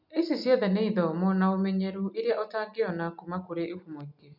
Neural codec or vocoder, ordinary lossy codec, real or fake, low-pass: none; none; real; 5.4 kHz